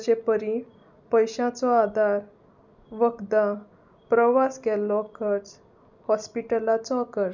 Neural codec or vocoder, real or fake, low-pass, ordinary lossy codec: none; real; 7.2 kHz; none